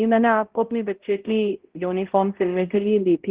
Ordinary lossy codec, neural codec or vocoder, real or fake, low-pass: Opus, 16 kbps; codec, 16 kHz, 0.5 kbps, X-Codec, HuBERT features, trained on balanced general audio; fake; 3.6 kHz